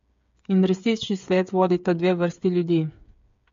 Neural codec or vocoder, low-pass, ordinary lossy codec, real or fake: codec, 16 kHz, 8 kbps, FreqCodec, smaller model; 7.2 kHz; MP3, 48 kbps; fake